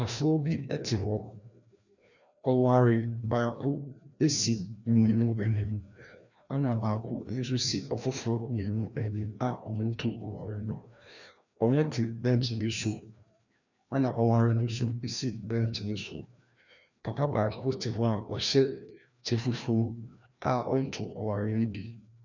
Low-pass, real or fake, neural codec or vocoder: 7.2 kHz; fake; codec, 16 kHz, 1 kbps, FreqCodec, larger model